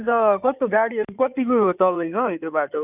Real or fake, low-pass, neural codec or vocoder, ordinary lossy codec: fake; 3.6 kHz; codec, 16 kHz in and 24 kHz out, 2.2 kbps, FireRedTTS-2 codec; none